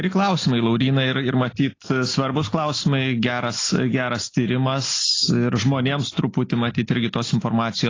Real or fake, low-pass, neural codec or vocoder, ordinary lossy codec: real; 7.2 kHz; none; AAC, 32 kbps